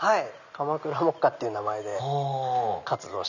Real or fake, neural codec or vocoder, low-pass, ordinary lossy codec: real; none; 7.2 kHz; none